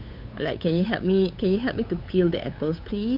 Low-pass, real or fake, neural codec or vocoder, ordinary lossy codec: 5.4 kHz; fake; codec, 16 kHz, 8 kbps, FunCodec, trained on LibriTTS, 25 frames a second; none